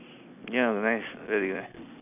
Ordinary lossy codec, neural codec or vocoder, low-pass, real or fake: none; none; 3.6 kHz; real